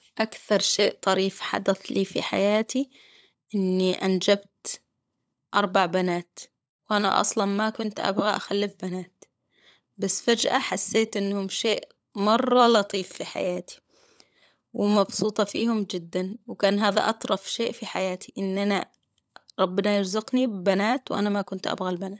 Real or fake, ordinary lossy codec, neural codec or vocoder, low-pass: fake; none; codec, 16 kHz, 16 kbps, FunCodec, trained on LibriTTS, 50 frames a second; none